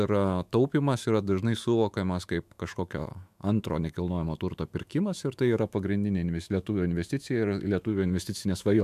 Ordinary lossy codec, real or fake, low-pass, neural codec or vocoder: MP3, 96 kbps; fake; 14.4 kHz; autoencoder, 48 kHz, 128 numbers a frame, DAC-VAE, trained on Japanese speech